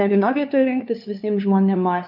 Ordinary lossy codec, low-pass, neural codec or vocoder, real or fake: MP3, 48 kbps; 5.4 kHz; codec, 16 kHz, 2 kbps, FunCodec, trained on LibriTTS, 25 frames a second; fake